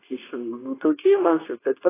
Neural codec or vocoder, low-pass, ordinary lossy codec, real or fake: codec, 24 kHz, 0.9 kbps, WavTokenizer, medium speech release version 2; 3.6 kHz; AAC, 16 kbps; fake